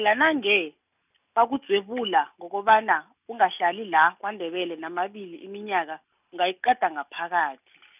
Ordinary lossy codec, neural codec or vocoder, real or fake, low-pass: none; none; real; 3.6 kHz